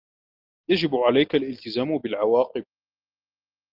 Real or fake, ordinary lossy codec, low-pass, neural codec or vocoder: real; Opus, 24 kbps; 5.4 kHz; none